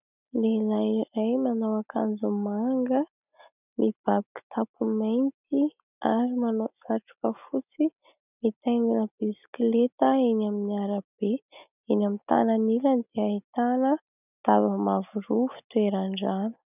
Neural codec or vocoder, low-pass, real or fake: none; 3.6 kHz; real